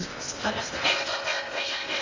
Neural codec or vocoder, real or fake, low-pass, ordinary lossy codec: codec, 16 kHz in and 24 kHz out, 0.6 kbps, FocalCodec, streaming, 2048 codes; fake; 7.2 kHz; AAC, 32 kbps